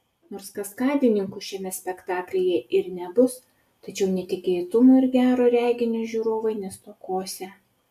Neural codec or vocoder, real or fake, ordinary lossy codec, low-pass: none; real; AAC, 96 kbps; 14.4 kHz